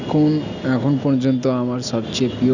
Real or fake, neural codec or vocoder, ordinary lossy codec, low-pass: real; none; Opus, 64 kbps; 7.2 kHz